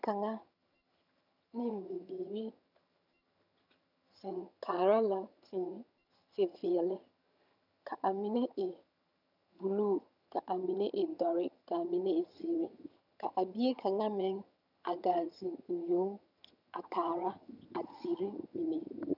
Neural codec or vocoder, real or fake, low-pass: vocoder, 22.05 kHz, 80 mel bands, HiFi-GAN; fake; 5.4 kHz